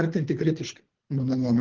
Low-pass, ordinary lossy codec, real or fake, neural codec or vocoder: 7.2 kHz; Opus, 16 kbps; fake; codec, 24 kHz, 3 kbps, HILCodec